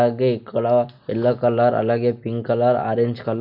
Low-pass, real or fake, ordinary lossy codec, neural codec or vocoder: 5.4 kHz; real; none; none